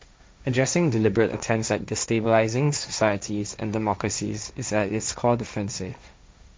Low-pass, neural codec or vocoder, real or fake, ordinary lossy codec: none; codec, 16 kHz, 1.1 kbps, Voila-Tokenizer; fake; none